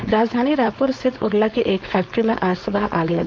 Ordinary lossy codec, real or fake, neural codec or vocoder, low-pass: none; fake; codec, 16 kHz, 4.8 kbps, FACodec; none